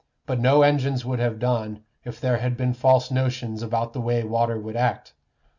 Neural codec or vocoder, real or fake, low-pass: none; real; 7.2 kHz